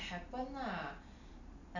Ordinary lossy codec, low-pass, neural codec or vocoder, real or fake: none; 7.2 kHz; none; real